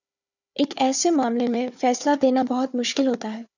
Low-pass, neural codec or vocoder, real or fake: 7.2 kHz; codec, 16 kHz, 4 kbps, FunCodec, trained on Chinese and English, 50 frames a second; fake